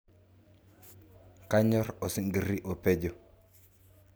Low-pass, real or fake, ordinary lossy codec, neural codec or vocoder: none; real; none; none